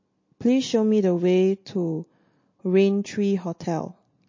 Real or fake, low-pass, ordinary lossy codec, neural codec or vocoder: real; 7.2 kHz; MP3, 32 kbps; none